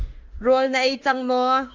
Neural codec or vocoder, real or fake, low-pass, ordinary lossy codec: autoencoder, 48 kHz, 32 numbers a frame, DAC-VAE, trained on Japanese speech; fake; 7.2 kHz; Opus, 32 kbps